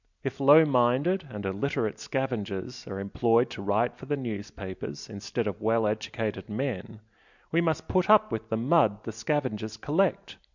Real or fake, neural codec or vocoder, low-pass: real; none; 7.2 kHz